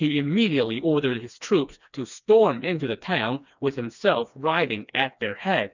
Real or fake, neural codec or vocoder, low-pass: fake; codec, 16 kHz, 2 kbps, FreqCodec, smaller model; 7.2 kHz